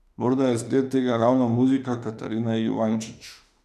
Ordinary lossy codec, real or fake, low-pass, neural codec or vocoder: none; fake; 14.4 kHz; autoencoder, 48 kHz, 32 numbers a frame, DAC-VAE, trained on Japanese speech